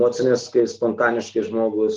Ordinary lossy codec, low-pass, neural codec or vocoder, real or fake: Opus, 16 kbps; 7.2 kHz; none; real